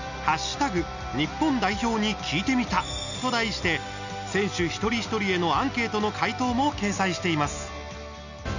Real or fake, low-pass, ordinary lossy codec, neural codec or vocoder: real; 7.2 kHz; none; none